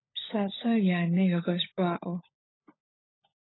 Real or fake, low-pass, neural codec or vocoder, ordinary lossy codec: fake; 7.2 kHz; codec, 16 kHz, 16 kbps, FunCodec, trained on LibriTTS, 50 frames a second; AAC, 16 kbps